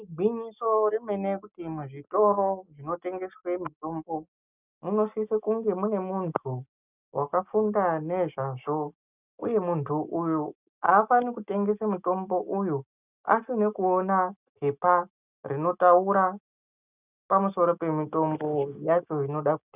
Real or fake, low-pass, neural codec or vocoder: real; 3.6 kHz; none